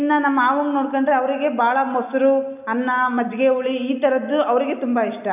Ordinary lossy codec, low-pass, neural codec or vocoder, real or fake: none; 3.6 kHz; none; real